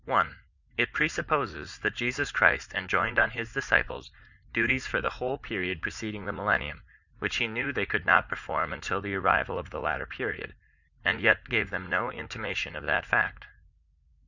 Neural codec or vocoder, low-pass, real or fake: vocoder, 44.1 kHz, 80 mel bands, Vocos; 7.2 kHz; fake